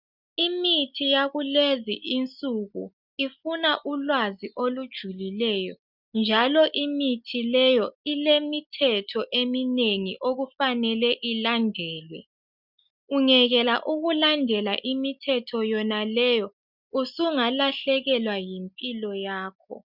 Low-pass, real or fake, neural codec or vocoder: 5.4 kHz; real; none